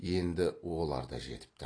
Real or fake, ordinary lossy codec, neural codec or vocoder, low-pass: real; AAC, 32 kbps; none; 9.9 kHz